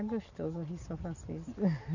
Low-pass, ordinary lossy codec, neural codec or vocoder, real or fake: 7.2 kHz; none; none; real